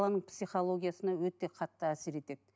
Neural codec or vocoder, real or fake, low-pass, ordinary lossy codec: none; real; none; none